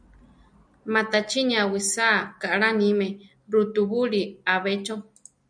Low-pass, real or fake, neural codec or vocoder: 9.9 kHz; real; none